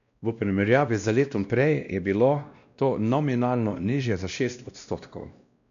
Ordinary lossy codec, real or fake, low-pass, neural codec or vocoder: none; fake; 7.2 kHz; codec, 16 kHz, 1 kbps, X-Codec, WavLM features, trained on Multilingual LibriSpeech